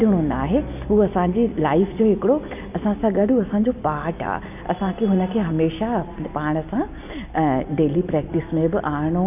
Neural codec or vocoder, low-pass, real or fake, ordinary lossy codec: none; 3.6 kHz; real; none